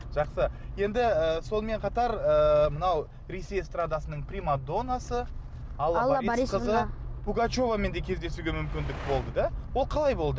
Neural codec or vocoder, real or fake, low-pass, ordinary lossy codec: none; real; none; none